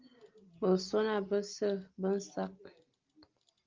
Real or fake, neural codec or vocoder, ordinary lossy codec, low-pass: real; none; Opus, 32 kbps; 7.2 kHz